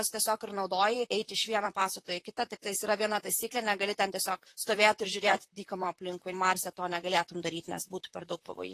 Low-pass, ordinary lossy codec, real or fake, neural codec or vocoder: 14.4 kHz; AAC, 48 kbps; fake; vocoder, 44.1 kHz, 128 mel bands, Pupu-Vocoder